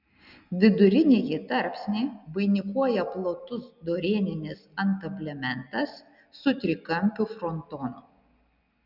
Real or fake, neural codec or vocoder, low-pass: real; none; 5.4 kHz